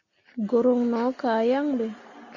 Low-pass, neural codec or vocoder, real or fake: 7.2 kHz; none; real